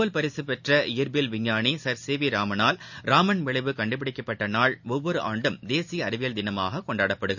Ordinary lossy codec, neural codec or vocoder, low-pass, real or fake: none; none; 7.2 kHz; real